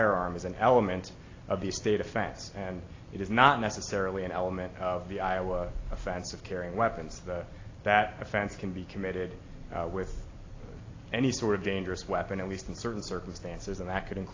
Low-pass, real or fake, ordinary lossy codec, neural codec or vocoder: 7.2 kHz; real; AAC, 32 kbps; none